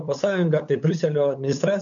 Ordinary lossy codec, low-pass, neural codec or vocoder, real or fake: MP3, 64 kbps; 7.2 kHz; codec, 16 kHz, 8 kbps, FunCodec, trained on LibriTTS, 25 frames a second; fake